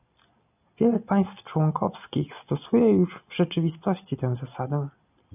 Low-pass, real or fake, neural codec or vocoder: 3.6 kHz; real; none